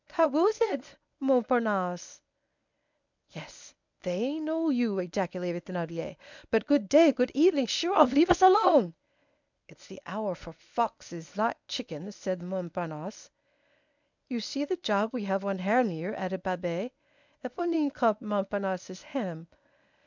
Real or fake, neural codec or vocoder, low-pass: fake; codec, 24 kHz, 0.9 kbps, WavTokenizer, medium speech release version 1; 7.2 kHz